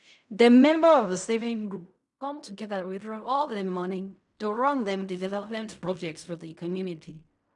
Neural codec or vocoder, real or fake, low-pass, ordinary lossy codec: codec, 16 kHz in and 24 kHz out, 0.4 kbps, LongCat-Audio-Codec, fine tuned four codebook decoder; fake; 10.8 kHz; none